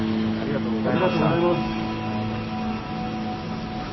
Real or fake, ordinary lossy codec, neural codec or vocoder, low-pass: real; MP3, 24 kbps; none; 7.2 kHz